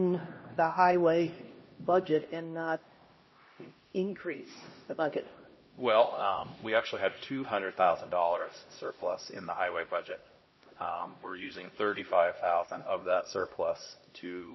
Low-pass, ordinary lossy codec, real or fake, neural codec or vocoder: 7.2 kHz; MP3, 24 kbps; fake; codec, 16 kHz, 1 kbps, X-Codec, HuBERT features, trained on LibriSpeech